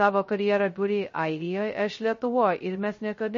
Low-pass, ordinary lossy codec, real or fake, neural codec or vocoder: 7.2 kHz; MP3, 32 kbps; fake; codec, 16 kHz, 0.2 kbps, FocalCodec